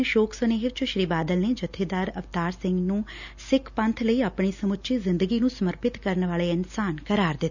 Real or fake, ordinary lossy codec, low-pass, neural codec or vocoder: real; none; 7.2 kHz; none